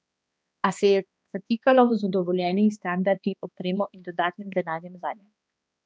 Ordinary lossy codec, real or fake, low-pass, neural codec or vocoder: none; fake; none; codec, 16 kHz, 2 kbps, X-Codec, HuBERT features, trained on balanced general audio